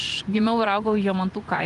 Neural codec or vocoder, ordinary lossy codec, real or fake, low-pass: vocoder, 24 kHz, 100 mel bands, Vocos; Opus, 32 kbps; fake; 10.8 kHz